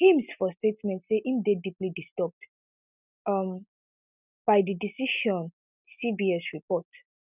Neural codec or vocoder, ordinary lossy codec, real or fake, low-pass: none; none; real; 3.6 kHz